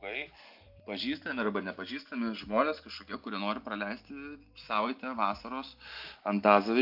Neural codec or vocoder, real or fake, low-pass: none; real; 5.4 kHz